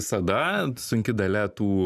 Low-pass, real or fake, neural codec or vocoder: 14.4 kHz; real; none